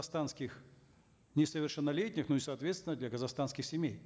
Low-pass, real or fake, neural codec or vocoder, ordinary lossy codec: none; real; none; none